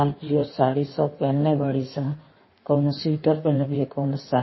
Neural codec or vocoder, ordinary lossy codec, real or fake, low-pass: codec, 16 kHz in and 24 kHz out, 1.1 kbps, FireRedTTS-2 codec; MP3, 24 kbps; fake; 7.2 kHz